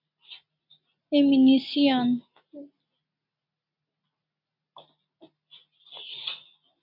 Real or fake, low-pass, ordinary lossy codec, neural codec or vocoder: real; 5.4 kHz; MP3, 48 kbps; none